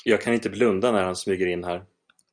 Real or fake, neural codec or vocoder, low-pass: real; none; 9.9 kHz